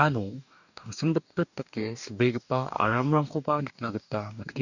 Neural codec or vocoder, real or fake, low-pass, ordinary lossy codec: codec, 44.1 kHz, 2.6 kbps, DAC; fake; 7.2 kHz; none